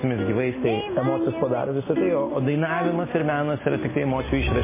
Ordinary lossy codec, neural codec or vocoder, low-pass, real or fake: MP3, 16 kbps; none; 3.6 kHz; real